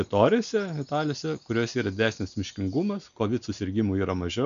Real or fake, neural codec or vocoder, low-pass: real; none; 7.2 kHz